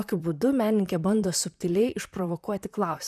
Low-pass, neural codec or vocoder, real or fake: 14.4 kHz; vocoder, 44.1 kHz, 128 mel bands, Pupu-Vocoder; fake